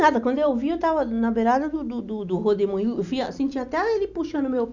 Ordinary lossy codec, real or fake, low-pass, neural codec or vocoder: none; real; 7.2 kHz; none